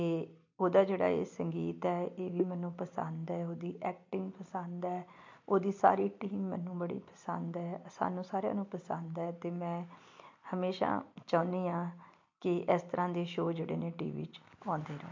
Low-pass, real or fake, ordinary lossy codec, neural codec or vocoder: 7.2 kHz; real; MP3, 48 kbps; none